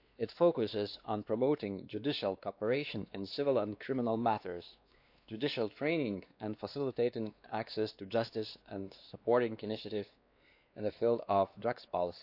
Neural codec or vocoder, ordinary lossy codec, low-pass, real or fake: codec, 16 kHz, 2 kbps, X-Codec, WavLM features, trained on Multilingual LibriSpeech; AAC, 48 kbps; 5.4 kHz; fake